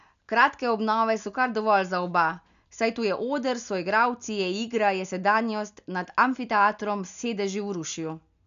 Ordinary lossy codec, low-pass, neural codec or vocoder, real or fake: none; 7.2 kHz; none; real